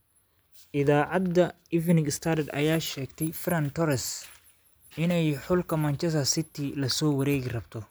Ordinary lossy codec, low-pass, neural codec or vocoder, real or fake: none; none; none; real